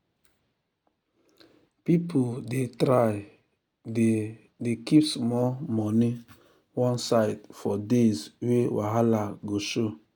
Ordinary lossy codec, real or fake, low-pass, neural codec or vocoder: none; real; none; none